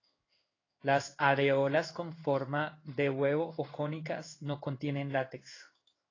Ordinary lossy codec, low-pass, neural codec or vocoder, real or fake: AAC, 32 kbps; 7.2 kHz; codec, 16 kHz in and 24 kHz out, 1 kbps, XY-Tokenizer; fake